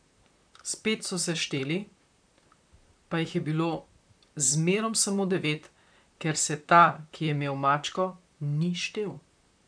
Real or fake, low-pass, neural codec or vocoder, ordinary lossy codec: fake; 9.9 kHz; vocoder, 44.1 kHz, 128 mel bands, Pupu-Vocoder; none